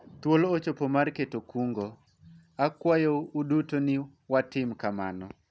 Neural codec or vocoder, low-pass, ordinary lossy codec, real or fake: none; none; none; real